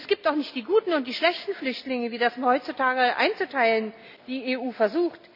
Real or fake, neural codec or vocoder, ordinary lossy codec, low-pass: real; none; none; 5.4 kHz